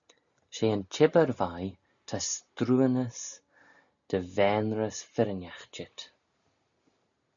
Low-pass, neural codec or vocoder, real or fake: 7.2 kHz; none; real